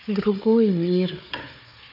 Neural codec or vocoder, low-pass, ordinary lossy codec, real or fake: codec, 16 kHz, 4 kbps, FunCodec, trained on Chinese and English, 50 frames a second; 5.4 kHz; none; fake